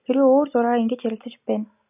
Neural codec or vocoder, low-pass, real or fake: none; 3.6 kHz; real